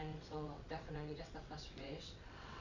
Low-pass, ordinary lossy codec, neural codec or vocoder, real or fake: 7.2 kHz; none; vocoder, 22.05 kHz, 80 mel bands, WaveNeXt; fake